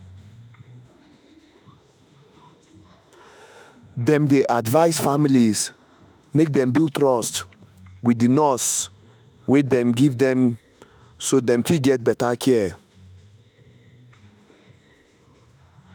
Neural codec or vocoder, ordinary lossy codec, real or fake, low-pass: autoencoder, 48 kHz, 32 numbers a frame, DAC-VAE, trained on Japanese speech; none; fake; none